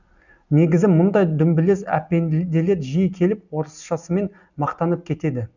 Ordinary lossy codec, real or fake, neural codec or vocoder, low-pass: none; real; none; 7.2 kHz